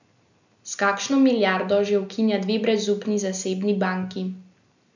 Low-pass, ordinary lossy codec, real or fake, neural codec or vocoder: 7.2 kHz; none; real; none